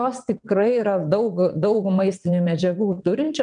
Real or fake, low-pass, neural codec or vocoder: fake; 9.9 kHz; vocoder, 22.05 kHz, 80 mel bands, WaveNeXt